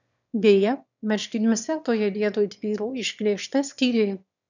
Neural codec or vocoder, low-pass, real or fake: autoencoder, 22.05 kHz, a latent of 192 numbers a frame, VITS, trained on one speaker; 7.2 kHz; fake